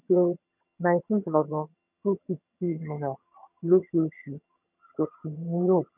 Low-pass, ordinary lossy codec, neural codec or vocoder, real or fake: 3.6 kHz; none; vocoder, 22.05 kHz, 80 mel bands, HiFi-GAN; fake